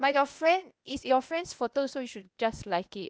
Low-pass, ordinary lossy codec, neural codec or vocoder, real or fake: none; none; codec, 16 kHz, 0.8 kbps, ZipCodec; fake